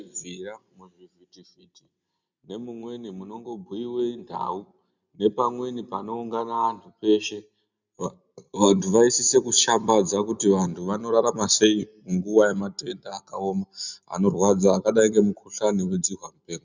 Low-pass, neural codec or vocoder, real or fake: 7.2 kHz; none; real